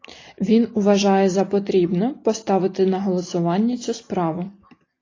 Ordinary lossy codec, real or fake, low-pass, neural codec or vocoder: AAC, 32 kbps; real; 7.2 kHz; none